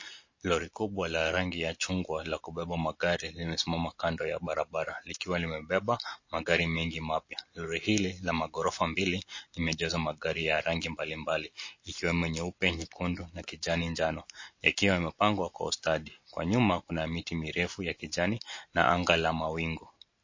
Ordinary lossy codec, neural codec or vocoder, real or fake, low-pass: MP3, 32 kbps; none; real; 7.2 kHz